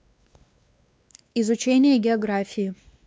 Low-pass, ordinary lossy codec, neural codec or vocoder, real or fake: none; none; codec, 16 kHz, 2 kbps, X-Codec, WavLM features, trained on Multilingual LibriSpeech; fake